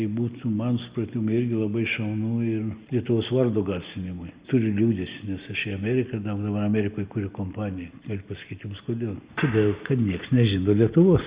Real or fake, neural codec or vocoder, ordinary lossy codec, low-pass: real; none; Opus, 64 kbps; 3.6 kHz